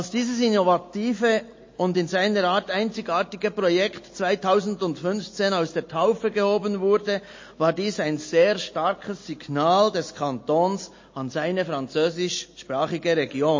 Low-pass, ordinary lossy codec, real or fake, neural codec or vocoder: 7.2 kHz; MP3, 32 kbps; real; none